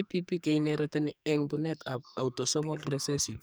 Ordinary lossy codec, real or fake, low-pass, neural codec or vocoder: none; fake; none; codec, 44.1 kHz, 2.6 kbps, SNAC